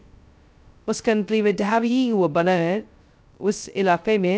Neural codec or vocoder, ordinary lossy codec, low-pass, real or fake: codec, 16 kHz, 0.2 kbps, FocalCodec; none; none; fake